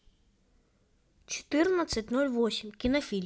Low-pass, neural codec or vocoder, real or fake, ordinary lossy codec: none; none; real; none